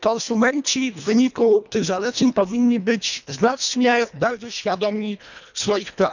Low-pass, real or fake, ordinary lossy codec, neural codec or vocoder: 7.2 kHz; fake; none; codec, 24 kHz, 1.5 kbps, HILCodec